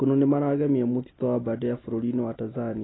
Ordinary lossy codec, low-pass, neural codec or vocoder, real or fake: AAC, 16 kbps; 7.2 kHz; none; real